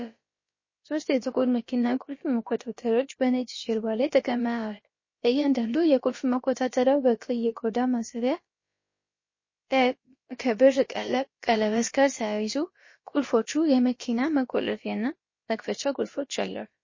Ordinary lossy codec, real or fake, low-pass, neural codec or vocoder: MP3, 32 kbps; fake; 7.2 kHz; codec, 16 kHz, about 1 kbps, DyCAST, with the encoder's durations